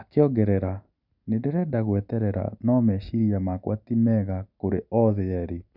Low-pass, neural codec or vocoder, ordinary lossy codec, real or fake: 5.4 kHz; none; none; real